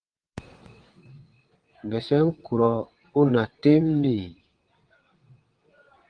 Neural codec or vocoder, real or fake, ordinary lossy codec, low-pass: vocoder, 22.05 kHz, 80 mel bands, WaveNeXt; fake; Opus, 24 kbps; 9.9 kHz